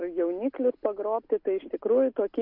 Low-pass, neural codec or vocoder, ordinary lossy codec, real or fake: 5.4 kHz; none; Opus, 64 kbps; real